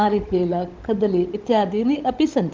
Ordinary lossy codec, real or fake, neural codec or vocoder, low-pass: Opus, 32 kbps; fake; codec, 16 kHz, 16 kbps, FunCodec, trained on LibriTTS, 50 frames a second; 7.2 kHz